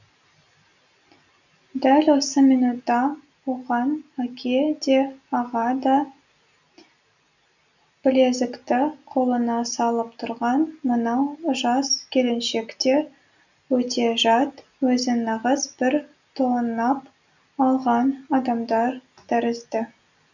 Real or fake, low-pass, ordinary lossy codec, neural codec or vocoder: real; 7.2 kHz; none; none